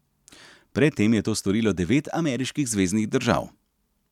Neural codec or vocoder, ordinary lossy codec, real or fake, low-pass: none; none; real; 19.8 kHz